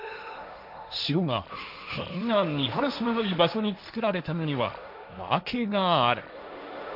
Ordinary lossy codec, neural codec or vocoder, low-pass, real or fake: none; codec, 16 kHz, 1.1 kbps, Voila-Tokenizer; 5.4 kHz; fake